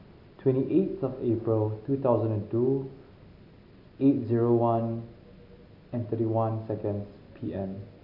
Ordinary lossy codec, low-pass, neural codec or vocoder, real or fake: none; 5.4 kHz; none; real